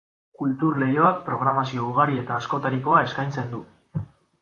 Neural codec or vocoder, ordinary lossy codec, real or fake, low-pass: vocoder, 44.1 kHz, 128 mel bands, Pupu-Vocoder; AAC, 48 kbps; fake; 10.8 kHz